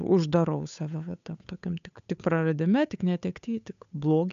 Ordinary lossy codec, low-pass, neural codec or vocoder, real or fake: AAC, 96 kbps; 7.2 kHz; codec, 16 kHz, 8 kbps, FunCodec, trained on Chinese and English, 25 frames a second; fake